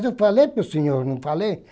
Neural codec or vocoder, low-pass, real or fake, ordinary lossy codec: none; none; real; none